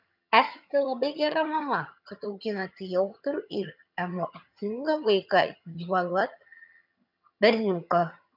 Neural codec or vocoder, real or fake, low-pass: vocoder, 22.05 kHz, 80 mel bands, HiFi-GAN; fake; 5.4 kHz